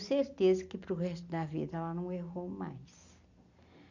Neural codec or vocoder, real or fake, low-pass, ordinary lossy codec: none; real; 7.2 kHz; none